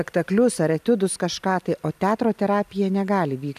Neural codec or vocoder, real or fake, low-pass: none; real; 14.4 kHz